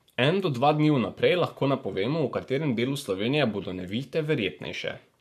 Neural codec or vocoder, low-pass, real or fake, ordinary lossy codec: codec, 44.1 kHz, 7.8 kbps, Pupu-Codec; 14.4 kHz; fake; none